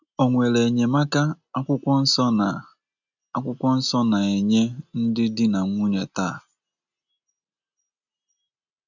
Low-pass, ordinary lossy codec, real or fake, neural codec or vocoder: 7.2 kHz; none; real; none